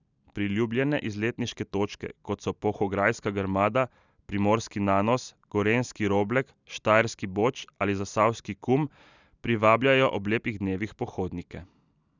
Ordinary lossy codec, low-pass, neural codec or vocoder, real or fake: none; 7.2 kHz; none; real